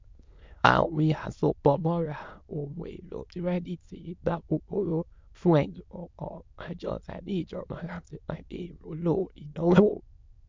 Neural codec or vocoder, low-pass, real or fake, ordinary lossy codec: autoencoder, 22.05 kHz, a latent of 192 numbers a frame, VITS, trained on many speakers; 7.2 kHz; fake; MP3, 64 kbps